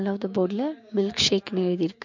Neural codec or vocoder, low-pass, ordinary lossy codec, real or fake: codec, 16 kHz, 6 kbps, DAC; 7.2 kHz; MP3, 48 kbps; fake